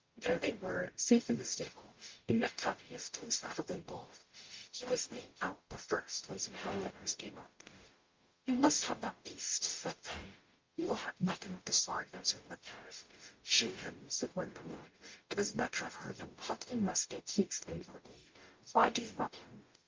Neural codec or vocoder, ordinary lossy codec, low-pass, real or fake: codec, 44.1 kHz, 0.9 kbps, DAC; Opus, 24 kbps; 7.2 kHz; fake